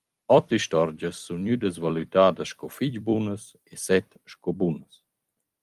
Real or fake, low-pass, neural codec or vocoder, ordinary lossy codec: fake; 14.4 kHz; vocoder, 44.1 kHz, 128 mel bands every 256 samples, BigVGAN v2; Opus, 32 kbps